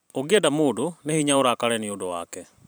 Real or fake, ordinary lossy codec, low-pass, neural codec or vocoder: fake; none; none; vocoder, 44.1 kHz, 128 mel bands every 512 samples, BigVGAN v2